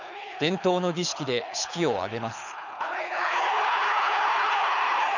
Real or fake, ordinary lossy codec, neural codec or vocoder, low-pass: fake; none; codec, 24 kHz, 6 kbps, HILCodec; 7.2 kHz